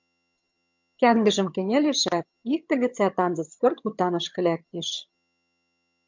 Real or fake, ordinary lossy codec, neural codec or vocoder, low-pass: fake; MP3, 64 kbps; vocoder, 22.05 kHz, 80 mel bands, HiFi-GAN; 7.2 kHz